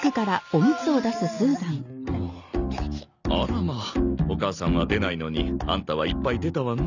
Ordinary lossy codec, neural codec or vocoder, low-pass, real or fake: none; none; 7.2 kHz; real